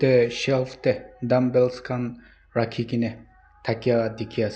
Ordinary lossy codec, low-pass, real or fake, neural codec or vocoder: none; none; real; none